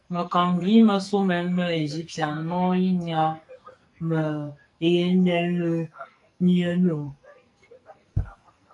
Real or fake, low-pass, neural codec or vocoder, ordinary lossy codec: fake; 10.8 kHz; codec, 44.1 kHz, 2.6 kbps, SNAC; AAC, 64 kbps